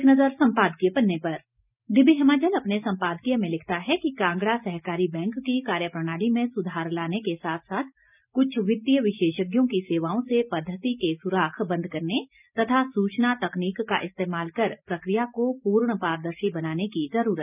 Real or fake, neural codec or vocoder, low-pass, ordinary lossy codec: real; none; 3.6 kHz; none